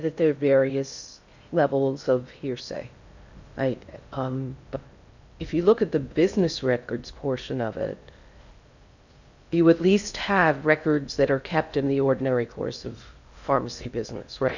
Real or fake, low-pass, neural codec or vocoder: fake; 7.2 kHz; codec, 16 kHz in and 24 kHz out, 0.6 kbps, FocalCodec, streaming, 4096 codes